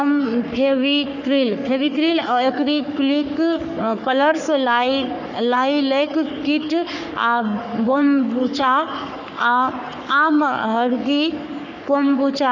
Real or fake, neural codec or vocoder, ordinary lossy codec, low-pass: fake; codec, 44.1 kHz, 3.4 kbps, Pupu-Codec; none; 7.2 kHz